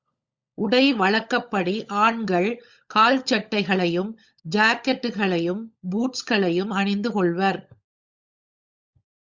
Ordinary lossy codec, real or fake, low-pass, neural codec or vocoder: Opus, 64 kbps; fake; 7.2 kHz; codec, 16 kHz, 16 kbps, FunCodec, trained on LibriTTS, 50 frames a second